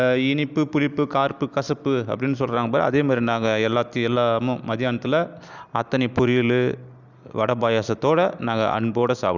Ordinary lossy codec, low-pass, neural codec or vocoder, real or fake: none; 7.2 kHz; none; real